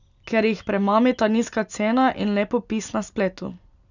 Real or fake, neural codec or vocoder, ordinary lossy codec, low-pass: real; none; none; 7.2 kHz